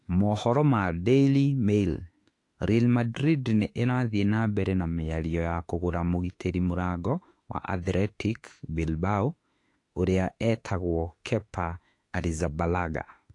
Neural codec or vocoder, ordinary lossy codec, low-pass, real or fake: autoencoder, 48 kHz, 32 numbers a frame, DAC-VAE, trained on Japanese speech; AAC, 48 kbps; 10.8 kHz; fake